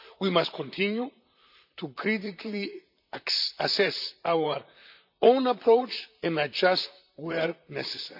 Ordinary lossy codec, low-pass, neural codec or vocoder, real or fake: none; 5.4 kHz; vocoder, 44.1 kHz, 128 mel bands, Pupu-Vocoder; fake